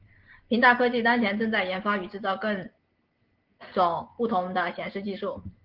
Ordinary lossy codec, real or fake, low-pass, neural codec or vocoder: Opus, 16 kbps; real; 5.4 kHz; none